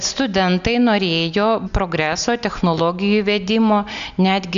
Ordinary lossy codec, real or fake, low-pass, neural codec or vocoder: MP3, 96 kbps; real; 7.2 kHz; none